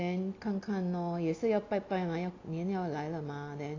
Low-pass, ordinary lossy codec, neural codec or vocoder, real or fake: 7.2 kHz; AAC, 32 kbps; none; real